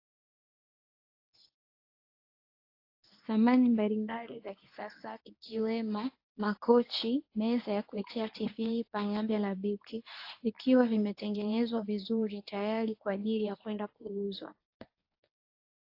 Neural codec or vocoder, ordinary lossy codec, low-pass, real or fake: codec, 24 kHz, 0.9 kbps, WavTokenizer, medium speech release version 1; AAC, 32 kbps; 5.4 kHz; fake